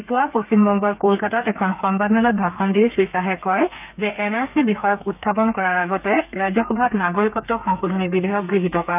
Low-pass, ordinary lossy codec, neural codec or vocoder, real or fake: 3.6 kHz; none; codec, 32 kHz, 1.9 kbps, SNAC; fake